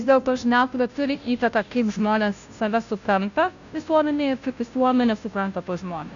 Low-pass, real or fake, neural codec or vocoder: 7.2 kHz; fake; codec, 16 kHz, 0.5 kbps, FunCodec, trained on Chinese and English, 25 frames a second